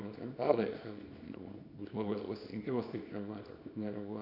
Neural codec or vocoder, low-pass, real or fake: codec, 24 kHz, 0.9 kbps, WavTokenizer, small release; 5.4 kHz; fake